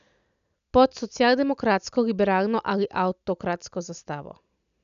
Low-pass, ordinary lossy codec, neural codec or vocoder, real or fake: 7.2 kHz; none; none; real